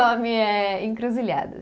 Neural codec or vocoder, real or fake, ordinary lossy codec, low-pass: none; real; none; none